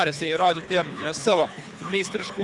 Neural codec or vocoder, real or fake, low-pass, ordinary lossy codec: codec, 24 kHz, 3 kbps, HILCodec; fake; 10.8 kHz; Opus, 64 kbps